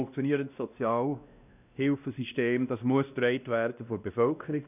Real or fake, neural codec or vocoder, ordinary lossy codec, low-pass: fake; codec, 16 kHz, 1 kbps, X-Codec, WavLM features, trained on Multilingual LibriSpeech; none; 3.6 kHz